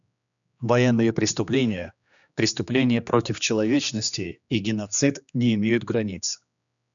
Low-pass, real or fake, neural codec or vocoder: 7.2 kHz; fake; codec, 16 kHz, 2 kbps, X-Codec, HuBERT features, trained on general audio